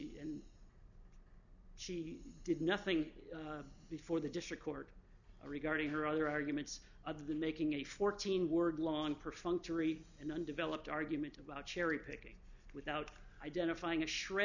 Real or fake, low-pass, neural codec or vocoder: real; 7.2 kHz; none